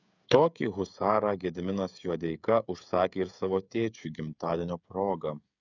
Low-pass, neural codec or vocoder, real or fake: 7.2 kHz; codec, 16 kHz, 8 kbps, FreqCodec, smaller model; fake